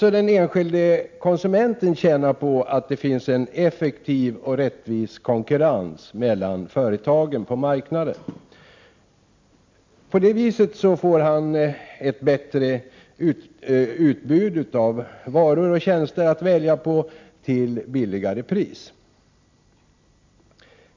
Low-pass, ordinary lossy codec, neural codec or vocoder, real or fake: 7.2 kHz; MP3, 64 kbps; none; real